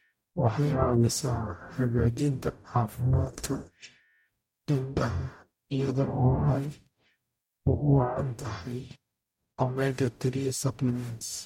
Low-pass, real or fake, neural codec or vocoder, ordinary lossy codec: 19.8 kHz; fake; codec, 44.1 kHz, 0.9 kbps, DAC; MP3, 64 kbps